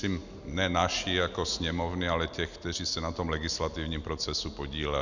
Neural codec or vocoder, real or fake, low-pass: none; real; 7.2 kHz